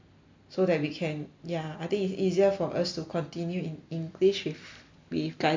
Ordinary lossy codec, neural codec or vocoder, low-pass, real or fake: MP3, 48 kbps; none; 7.2 kHz; real